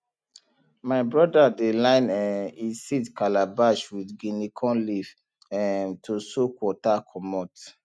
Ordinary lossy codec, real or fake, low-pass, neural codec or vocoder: none; real; none; none